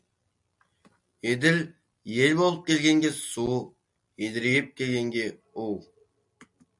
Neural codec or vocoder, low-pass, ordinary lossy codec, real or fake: none; 10.8 kHz; MP3, 64 kbps; real